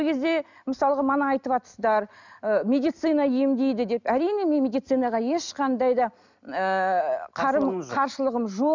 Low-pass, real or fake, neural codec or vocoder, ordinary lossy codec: 7.2 kHz; real; none; none